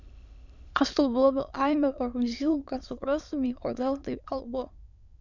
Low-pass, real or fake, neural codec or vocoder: 7.2 kHz; fake; autoencoder, 22.05 kHz, a latent of 192 numbers a frame, VITS, trained on many speakers